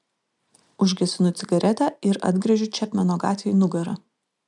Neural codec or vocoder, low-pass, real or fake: none; 10.8 kHz; real